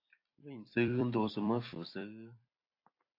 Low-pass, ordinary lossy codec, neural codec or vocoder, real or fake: 5.4 kHz; AAC, 32 kbps; vocoder, 44.1 kHz, 128 mel bands every 256 samples, BigVGAN v2; fake